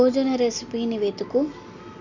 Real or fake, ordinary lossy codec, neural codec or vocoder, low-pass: real; none; none; 7.2 kHz